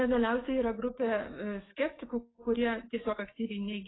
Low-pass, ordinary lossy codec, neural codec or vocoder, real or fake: 7.2 kHz; AAC, 16 kbps; codec, 44.1 kHz, 7.8 kbps, DAC; fake